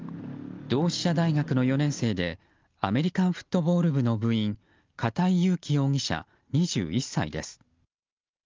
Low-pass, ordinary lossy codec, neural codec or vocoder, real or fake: 7.2 kHz; Opus, 24 kbps; none; real